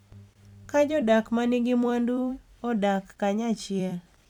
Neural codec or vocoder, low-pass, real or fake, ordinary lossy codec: vocoder, 44.1 kHz, 128 mel bands every 256 samples, BigVGAN v2; 19.8 kHz; fake; none